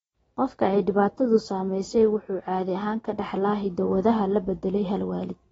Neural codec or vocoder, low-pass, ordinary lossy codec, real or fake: none; 19.8 kHz; AAC, 24 kbps; real